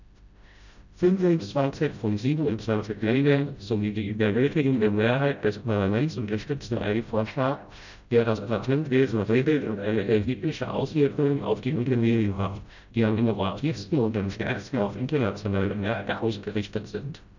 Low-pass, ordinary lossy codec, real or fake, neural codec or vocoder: 7.2 kHz; none; fake; codec, 16 kHz, 0.5 kbps, FreqCodec, smaller model